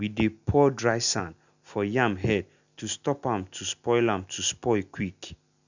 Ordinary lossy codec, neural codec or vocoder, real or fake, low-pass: none; none; real; 7.2 kHz